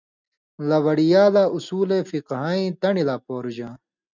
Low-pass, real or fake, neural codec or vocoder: 7.2 kHz; real; none